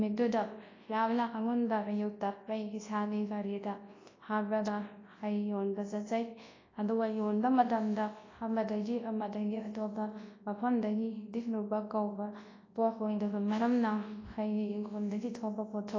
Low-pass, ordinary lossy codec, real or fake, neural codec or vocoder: 7.2 kHz; AAC, 32 kbps; fake; codec, 24 kHz, 0.9 kbps, WavTokenizer, large speech release